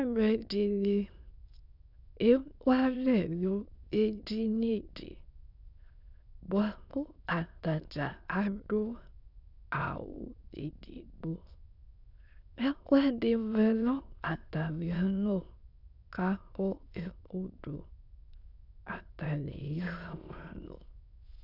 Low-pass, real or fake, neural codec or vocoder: 5.4 kHz; fake; autoencoder, 22.05 kHz, a latent of 192 numbers a frame, VITS, trained on many speakers